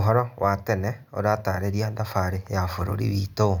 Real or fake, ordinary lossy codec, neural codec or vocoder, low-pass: real; none; none; 19.8 kHz